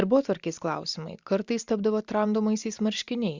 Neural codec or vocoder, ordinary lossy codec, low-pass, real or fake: none; Opus, 64 kbps; 7.2 kHz; real